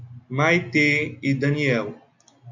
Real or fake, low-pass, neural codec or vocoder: real; 7.2 kHz; none